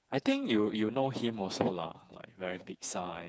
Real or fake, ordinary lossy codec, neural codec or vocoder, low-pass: fake; none; codec, 16 kHz, 4 kbps, FreqCodec, smaller model; none